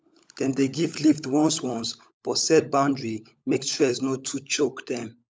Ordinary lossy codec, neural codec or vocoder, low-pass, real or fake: none; codec, 16 kHz, 16 kbps, FunCodec, trained on LibriTTS, 50 frames a second; none; fake